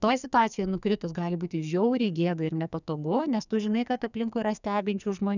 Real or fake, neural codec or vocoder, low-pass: fake; codec, 44.1 kHz, 2.6 kbps, SNAC; 7.2 kHz